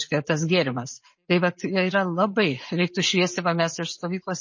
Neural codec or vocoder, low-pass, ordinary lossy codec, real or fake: none; 7.2 kHz; MP3, 32 kbps; real